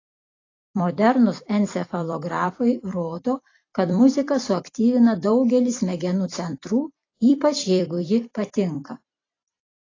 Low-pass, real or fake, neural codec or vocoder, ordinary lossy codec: 7.2 kHz; fake; vocoder, 44.1 kHz, 128 mel bands every 256 samples, BigVGAN v2; AAC, 32 kbps